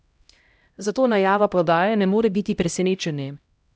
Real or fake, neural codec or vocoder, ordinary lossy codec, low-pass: fake; codec, 16 kHz, 0.5 kbps, X-Codec, HuBERT features, trained on LibriSpeech; none; none